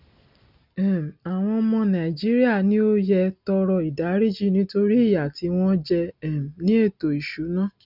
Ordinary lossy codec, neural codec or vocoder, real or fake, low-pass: none; none; real; 5.4 kHz